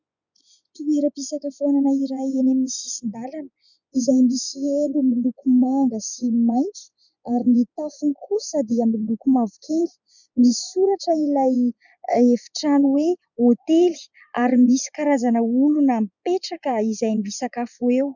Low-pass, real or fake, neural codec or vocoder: 7.2 kHz; fake; vocoder, 24 kHz, 100 mel bands, Vocos